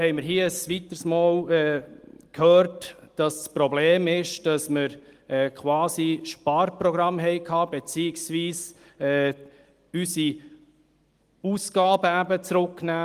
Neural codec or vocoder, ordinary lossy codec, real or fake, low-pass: none; Opus, 24 kbps; real; 14.4 kHz